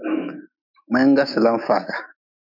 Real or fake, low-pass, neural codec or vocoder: fake; 5.4 kHz; autoencoder, 48 kHz, 128 numbers a frame, DAC-VAE, trained on Japanese speech